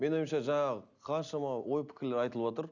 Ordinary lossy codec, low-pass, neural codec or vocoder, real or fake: none; 7.2 kHz; none; real